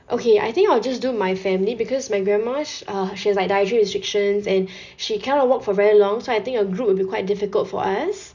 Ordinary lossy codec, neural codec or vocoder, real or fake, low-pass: none; none; real; 7.2 kHz